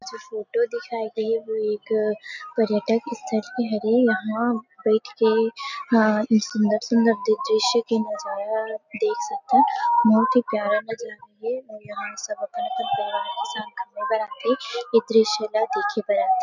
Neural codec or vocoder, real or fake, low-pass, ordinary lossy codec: none; real; 7.2 kHz; none